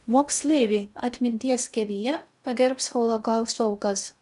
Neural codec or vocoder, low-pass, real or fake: codec, 16 kHz in and 24 kHz out, 0.6 kbps, FocalCodec, streaming, 2048 codes; 10.8 kHz; fake